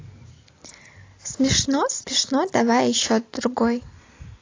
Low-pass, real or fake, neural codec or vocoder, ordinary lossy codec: 7.2 kHz; real; none; AAC, 32 kbps